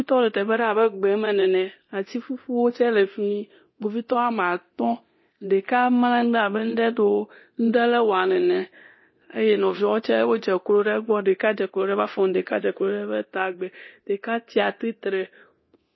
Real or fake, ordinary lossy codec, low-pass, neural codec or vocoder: fake; MP3, 24 kbps; 7.2 kHz; codec, 24 kHz, 0.9 kbps, DualCodec